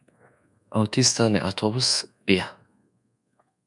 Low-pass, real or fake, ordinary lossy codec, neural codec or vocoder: 10.8 kHz; fake; MP3, 96 kbps; codec, 24 kHz, 1.2 kbps, DualCodec